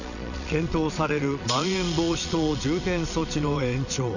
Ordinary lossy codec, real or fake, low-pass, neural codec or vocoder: none; fake; 7.2 kHz; vocoder, 22.05 kHz, 80 mel bands, Vocos